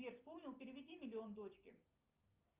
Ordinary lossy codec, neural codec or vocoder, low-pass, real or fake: Opus, 24 kbps; none; 3.6 kHz; real